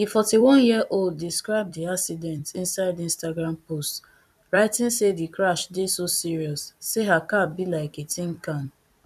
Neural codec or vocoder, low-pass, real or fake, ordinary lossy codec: vocoder, 44.1 kHz, 128 mel bands every 256 samples, BigVGAN v2; 14.4 kHz; fake; none